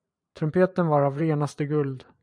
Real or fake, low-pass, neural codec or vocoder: fake; 9.9 kHz; vocoder, 44.1 kHz, 128 mel bands every 512 samples, BigVGAN v2